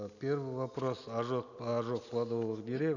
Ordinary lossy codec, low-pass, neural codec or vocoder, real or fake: none; 7.2 kHz; none; real